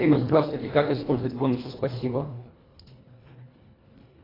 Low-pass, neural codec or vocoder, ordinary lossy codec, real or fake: 5.4 kHz; codec, 24 kHz, 1.5 kbps, HILCodec; AAC, 24 kbps; fake